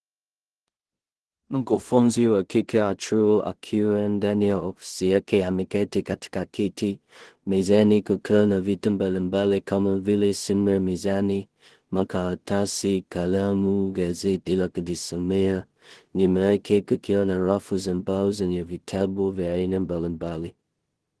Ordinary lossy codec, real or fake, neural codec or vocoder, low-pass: Opus, 16 kbps; fake; codec, 16 kHz in and 24 kHz out, 0.4 kbps, LongCat-Audio-Codec, two codebook decoder; 10.8 kHz